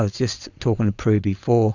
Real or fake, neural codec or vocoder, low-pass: fake; vocoder, 22.05 kHz, 80 mel bands, Vocos; 7.2 kHz